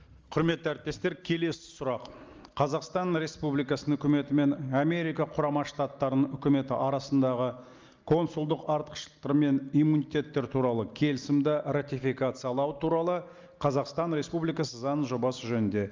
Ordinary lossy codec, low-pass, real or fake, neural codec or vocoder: Opus, 24 kbps; 7.2 kHz; real; none